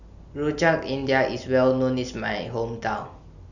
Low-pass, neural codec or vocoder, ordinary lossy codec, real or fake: 7.2 kHz; none; none; real